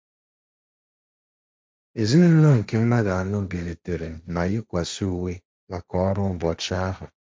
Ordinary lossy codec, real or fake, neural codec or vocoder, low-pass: none; fake; codec, 16 kHz, 1.1 kbps, Voila-Tokenizer; none